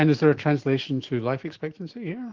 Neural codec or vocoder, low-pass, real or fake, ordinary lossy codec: autoencoder, 48 kHz, 128 numbers a frame, DAC-VAE, trained on Japanese speech; 7.2 kHz; fake; Opus, 16 kbps